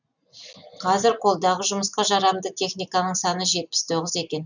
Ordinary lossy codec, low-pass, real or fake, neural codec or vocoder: none; 7.2 kHz; real; none